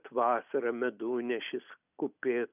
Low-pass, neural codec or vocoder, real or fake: 3.6 kHz; none; real